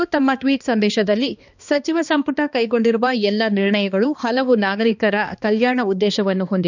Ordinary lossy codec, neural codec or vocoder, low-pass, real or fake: none; codec, 16 kHz, 2 kbps, X-Codec, HuBERT features, trained on balanced general audio; 7.2 kHz; fake